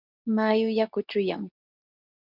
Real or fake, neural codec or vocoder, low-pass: fake; codec, 24 kHz, 0.9 kbps, WavTokenizer, medium speech release version 2; 5.4 kHz